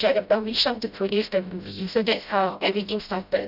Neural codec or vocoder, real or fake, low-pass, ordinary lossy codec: codec, 16 kHz, 0.5 kbps, FreqCodec, smaller model; fake; 5.4 kHz; none